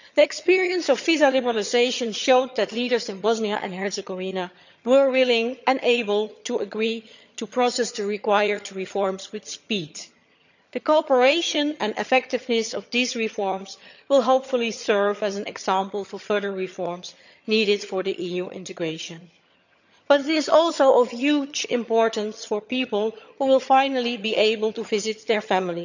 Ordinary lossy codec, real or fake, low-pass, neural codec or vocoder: none; fake; 7.2 kHz; vocoder, 22.05 kHz, 80 mel bands, HiFi-GAN